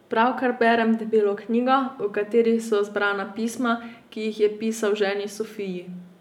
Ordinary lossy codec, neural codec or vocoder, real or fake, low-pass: none; none; real; 19.8 kHz